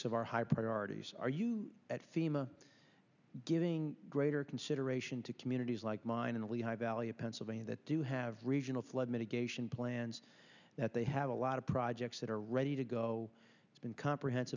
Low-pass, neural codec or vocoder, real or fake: 7.2 kHz; none; real